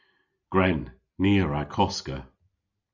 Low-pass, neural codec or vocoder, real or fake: 7.2 kHz; none; real